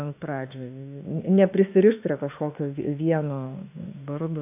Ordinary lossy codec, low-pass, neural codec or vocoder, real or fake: AAC, 32 kbps; 3.6 kHz; autoencoder, 48 kHz, 32 numbers a frame, DAC-VAE, trained on Japanese speech; fake